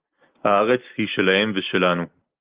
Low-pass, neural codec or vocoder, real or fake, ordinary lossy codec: 3.6 kHz; none; real; Opus, 32 kbps